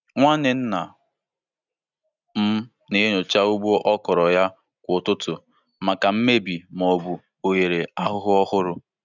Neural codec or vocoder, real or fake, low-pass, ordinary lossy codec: none; real; 7.2 kHz; none